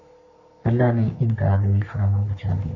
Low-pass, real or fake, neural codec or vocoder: 7.2 kHz; fake; codec, 44.1 kHz, 3.4 kbps, Pupu-Codec